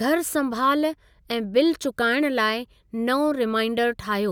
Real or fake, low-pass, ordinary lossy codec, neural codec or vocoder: real; none; none; none